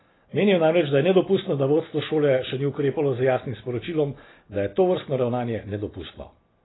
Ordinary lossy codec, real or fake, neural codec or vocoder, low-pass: AAC, 16 kbps; real; none; 7.2 kHz